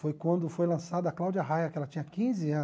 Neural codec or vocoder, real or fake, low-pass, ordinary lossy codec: none; real; none; none